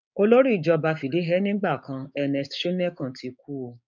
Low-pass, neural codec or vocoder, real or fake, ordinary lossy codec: 7.2 kHz; codec, 16 kHz, 6 kbps, DAC; fake; none